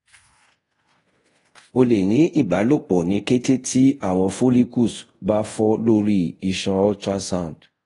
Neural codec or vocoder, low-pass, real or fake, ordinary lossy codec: codec, 24 kHz, 0.5 kbps, DualCodec; 10.8 kHz; fake; AAC, 32 kbps